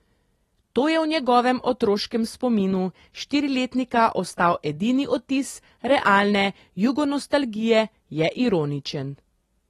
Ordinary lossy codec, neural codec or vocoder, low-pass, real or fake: AAC, 32 kbps; none; 19.8 kHz; real